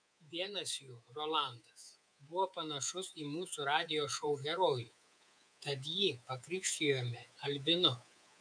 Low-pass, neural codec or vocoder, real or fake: 9.9 kHz; codec, 24 kHz, 3.1 kbps, DualCodec; fake